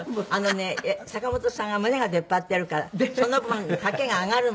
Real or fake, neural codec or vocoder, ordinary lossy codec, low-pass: real; none; none; none